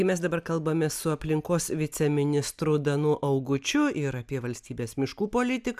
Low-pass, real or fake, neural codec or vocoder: 14.4 kHz; real; none